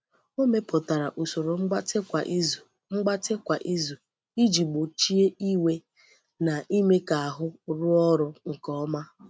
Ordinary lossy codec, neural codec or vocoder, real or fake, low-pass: none; none; real; none